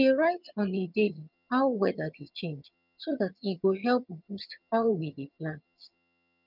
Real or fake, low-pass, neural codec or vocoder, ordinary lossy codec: fake; 5.4 kHz; vocoder, 22.05 kHz, 80 mel bands, HiFi-GAN; none